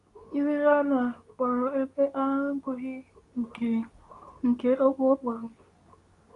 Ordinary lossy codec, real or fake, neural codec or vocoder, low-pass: none; fake; codec, 24 kHz, 0.9 kbps, WavTokenizer, medium speech release version 2; 10.8 kHz